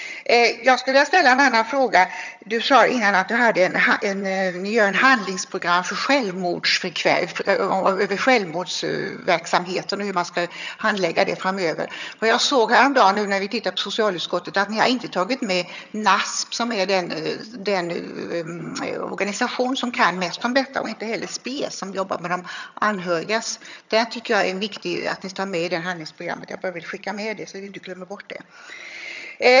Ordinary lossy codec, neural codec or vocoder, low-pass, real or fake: none; vocoder, 22.05 kHz, 80 mel bands, HiFi-GAN; 7.2 kHz; fake